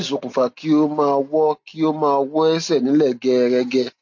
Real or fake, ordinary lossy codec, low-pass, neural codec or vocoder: real; MP3, 48 kbps; 7.2 kHz; none